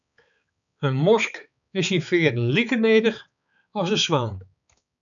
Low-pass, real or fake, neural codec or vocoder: 7.2 kHz; fake; codec, 16 kHz, 4 kbps, X-Codec, HuBERT features, trained on balanced general audio